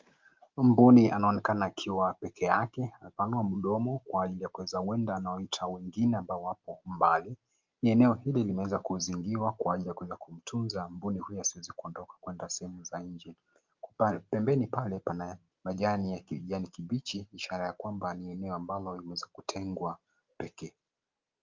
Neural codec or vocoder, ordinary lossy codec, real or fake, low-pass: none; Opus, 24 kbps; real; 7.2 kHz